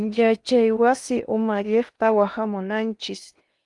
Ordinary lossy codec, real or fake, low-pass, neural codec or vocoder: Opus, 32 kbps; fake; 10.8 kHz; codec, 16 kHz in and 24 kHz out, 0.8 kbps, FocalCodec, streaming, 65536 codes